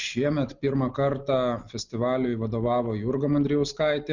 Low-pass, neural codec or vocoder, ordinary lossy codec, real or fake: 7.2 kHz; none; Opus, 64 kbps; real